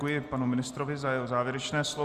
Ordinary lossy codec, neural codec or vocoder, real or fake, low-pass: Opus, 32 kbps; none; real; 14.4 kHz